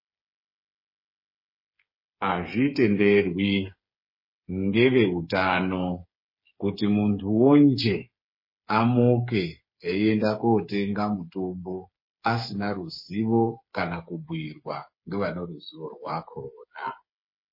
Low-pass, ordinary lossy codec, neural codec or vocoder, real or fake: 5.4 kHz; MP3, 24 kbps; codec, 16 kHz, 8 kbps, FreqCodec, smaller model; fake